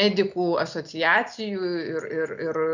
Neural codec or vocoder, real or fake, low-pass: none; real; 7.2 kHz